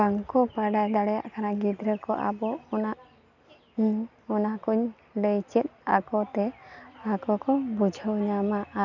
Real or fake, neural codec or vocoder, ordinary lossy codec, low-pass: real; none; none; 7.2 kHz